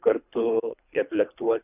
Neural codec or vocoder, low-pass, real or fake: codec, 24 kHz, 3 kbps, HILCodec; 3.6 kHz; fake